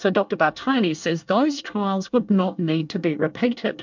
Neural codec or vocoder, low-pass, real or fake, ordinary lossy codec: codec, 24 kHz, 1 kbps, SNAC; 7.2 kHz; fake; MP3, 64 kbps